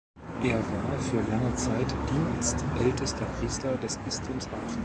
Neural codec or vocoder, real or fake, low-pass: codec, 44.1 kHz, 7.8 kbps, DAC; fake; 9.9 kHz